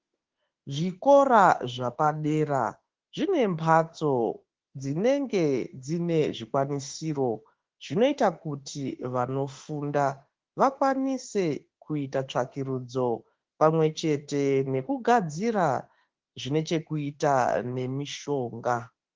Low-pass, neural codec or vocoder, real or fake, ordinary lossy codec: 7.2 kHz; autoencoder, 48 kHz, 32 numbers a frame, DAC-VAE, trained on Japanese speech; fake; Opus, 16 kbps